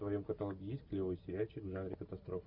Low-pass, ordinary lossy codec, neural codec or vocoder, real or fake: 5.4 kHz; Opus, 64 kbps; none; real